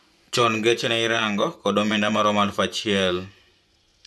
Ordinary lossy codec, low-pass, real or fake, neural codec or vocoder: none; none; fake; vocoder, 24 kHz, 100 mel bands, Vocos